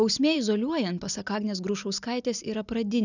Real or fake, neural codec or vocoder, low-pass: real; none; 7.2 kHz